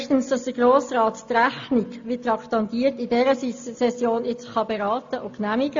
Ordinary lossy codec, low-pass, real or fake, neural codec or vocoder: AAC, 48 kbps; 7.2 kHz; real; none